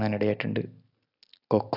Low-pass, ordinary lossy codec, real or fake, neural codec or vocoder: 5.4 kHz; AAC, 48 kbps; real; none